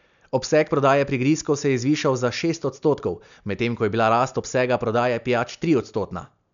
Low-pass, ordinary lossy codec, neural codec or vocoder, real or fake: 7.2 kHz; none; none; real